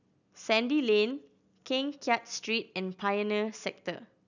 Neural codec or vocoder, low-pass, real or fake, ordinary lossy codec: none; 7.2 kHz; real; none